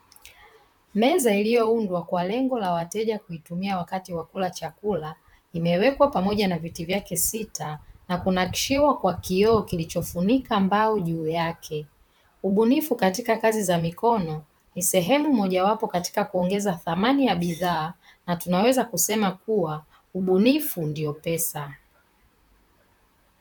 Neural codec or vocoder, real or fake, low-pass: vocoder, 44.1 kHz, 128 mel bands, Pupu-Vocoder; fake; 19.8 kHz